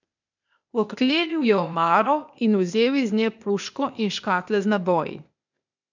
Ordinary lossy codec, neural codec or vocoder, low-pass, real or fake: none; codec, 16 kHz, 0.8 kbps, ZipCodec; 7.2 kHz; fake